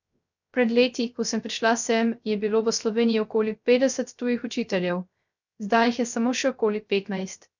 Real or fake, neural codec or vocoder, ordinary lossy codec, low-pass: fake; codec, 16 kHz, 0.3 kbps, FocalCodec; none; 7.2 kHz